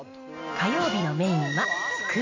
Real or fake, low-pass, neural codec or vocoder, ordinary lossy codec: real; 7.2 kHz; none; MP3, 48 kbps